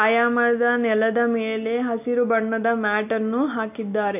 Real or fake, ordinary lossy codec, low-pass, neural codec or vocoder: real; none; 3.6 kHz; none